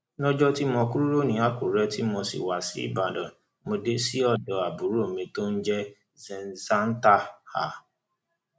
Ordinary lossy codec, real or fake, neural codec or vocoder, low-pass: none; real; none; none